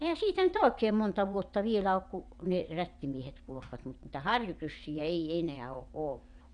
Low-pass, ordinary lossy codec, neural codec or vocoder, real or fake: 9.9 kHz; none; vocoder, 22.05 kHz, 80 mel bands, WaveNeXt; fake